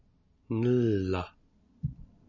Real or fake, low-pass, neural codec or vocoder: real; 7.2 kHz; none